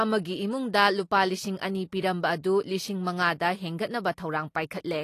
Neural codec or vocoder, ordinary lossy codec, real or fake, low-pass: none; AAC, 48 kbps; real; 14.4 kHz